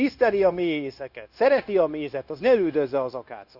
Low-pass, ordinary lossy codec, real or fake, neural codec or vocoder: 5.4 kHz; Opus, 64 kbps; fake; codec, 16 kHz, 0.9 kbps, LongCat-Audio-Codec